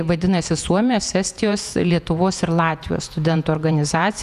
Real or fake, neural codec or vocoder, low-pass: fake; vocoder, 48 kHz, 128 mel bands, Vocos; 14.4 kHz